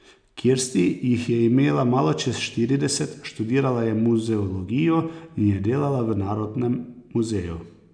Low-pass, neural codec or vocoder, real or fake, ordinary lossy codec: 9.9 kHz; none; real; none